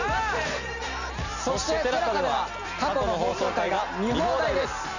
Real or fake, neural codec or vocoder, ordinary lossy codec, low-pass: real; none; none; 7.2 kHz